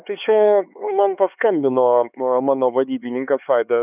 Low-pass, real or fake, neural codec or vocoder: 3.6 kHz; fake; codec, 16 kHz, 4 kbps, X-Codec, HuBERT features, trained on LibriSpeech